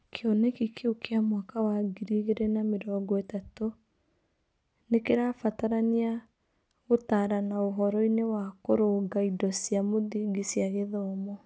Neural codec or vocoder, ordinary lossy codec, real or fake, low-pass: none; none; real; none